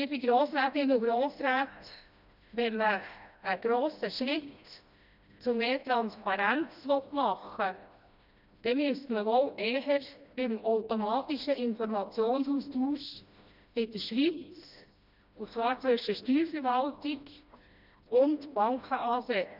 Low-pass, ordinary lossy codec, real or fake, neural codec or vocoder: 5.4 kHz; none; fake; codec, 16 kHz, 1 kbps, FreqCodec, smaller model